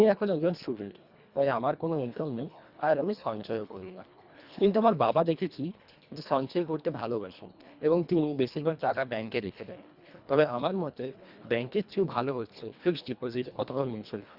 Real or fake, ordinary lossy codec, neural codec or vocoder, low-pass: fake; Opus, 64 kbps; codec, 24 kHz, 1.5 kbps, HILCodec; 5.4 kHz